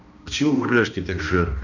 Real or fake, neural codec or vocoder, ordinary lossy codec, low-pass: fake; codec, 16 kHz, 1 kbps, X-Codec, HuBERT features, trained on balanced general audio; none; 7.2 kHz